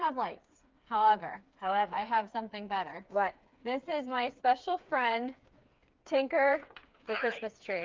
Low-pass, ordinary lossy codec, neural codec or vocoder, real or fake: 7.2 kHz; Opus, 32 kbps; codec, 16 kHz, 4 kbps, FreqCodec, smaller model; fake